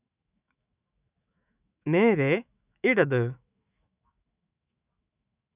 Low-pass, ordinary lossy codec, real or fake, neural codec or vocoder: 3.6 kHz; none; fake; autoencoder, 48 kHz, 128 numbers a frame, DAC-VAE, trained on Japanese speech